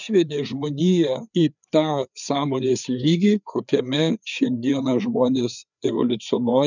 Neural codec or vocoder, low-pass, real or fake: codec, 16 kHz, 4 kbps, FreqCodec, larger model; 7.2 kHz; fake